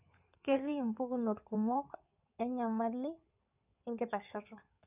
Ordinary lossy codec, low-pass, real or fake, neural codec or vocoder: none; 3.6 kHz; fake; codec, 16 kHz in and 24 kHz out, 2.2 kbps, FireRedTTS-2 codec